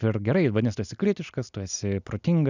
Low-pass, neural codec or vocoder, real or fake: 7.2 kHz; none; real